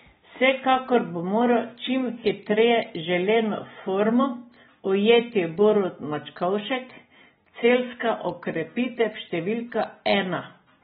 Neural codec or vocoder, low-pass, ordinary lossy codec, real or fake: none; 14.4 kHz; AAC, 16 kbps; real